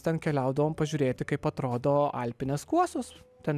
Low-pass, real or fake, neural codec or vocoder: 14.4 kHz; real; none